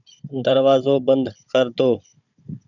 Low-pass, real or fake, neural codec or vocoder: 7.2 kHz; fake; codec, 16 kHz, 16 kbps, FunCodec, trained on Chinese and English, 50 frames a second